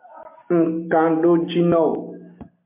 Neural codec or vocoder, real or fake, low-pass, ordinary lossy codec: none; real; 3.6 kHz; MP3, 32 kbps